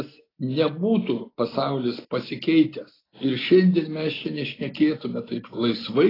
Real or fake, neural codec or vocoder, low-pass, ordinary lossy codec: real; none; 5.4 kHz; AAC, 24 kbps